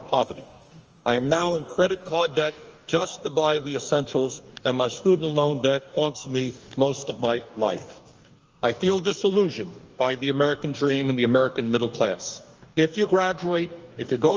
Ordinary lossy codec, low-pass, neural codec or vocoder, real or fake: Opus, 24 kbps; 7.2 kHz; codec, 44.1 kHz, 2.6 kbps, DAC; fake